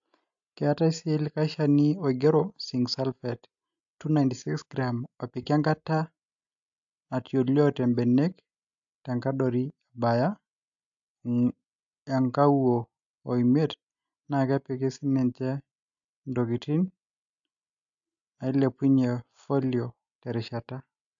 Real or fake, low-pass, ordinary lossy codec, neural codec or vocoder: real; 7.2 kHz; AAC, 64 kbps; none